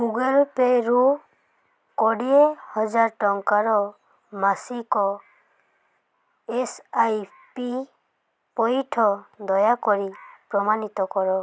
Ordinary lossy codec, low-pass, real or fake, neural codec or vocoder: none; none; real; none